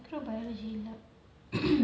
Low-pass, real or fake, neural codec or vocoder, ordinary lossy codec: none; real; none; none